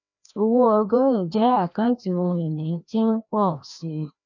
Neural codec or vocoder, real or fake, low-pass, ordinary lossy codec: codec, 16 kHz, 1 kbps, FreqCodec, larger model; fake; 7.2 kHz; none